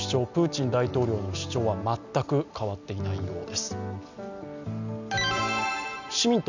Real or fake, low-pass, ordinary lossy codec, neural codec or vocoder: real; 7.2 kHz; none; none